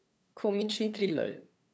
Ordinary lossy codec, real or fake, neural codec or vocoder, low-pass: none; fake; codec, 16 kHz, 2 kbps, FunCodec, trained on LibriTTS, 25 frames a second; none